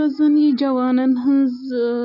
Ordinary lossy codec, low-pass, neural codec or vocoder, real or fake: none; 5.4 kHz; none; real